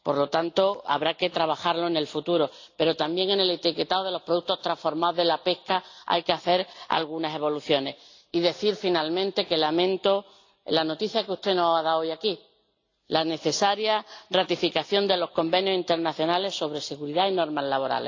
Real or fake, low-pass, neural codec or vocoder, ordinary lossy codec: real; 7.2 kHz; none; AAC, 48 kbps